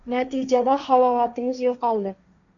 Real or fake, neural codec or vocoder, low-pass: fake; codec, 16 kHz, 1.1 kbps, Voila-Tokenizer; 7.2 kHz